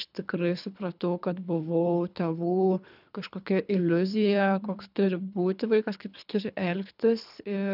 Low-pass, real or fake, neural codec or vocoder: 5.4 kHz; fake; codec, 24 kHz, 3 kbps, HILCodec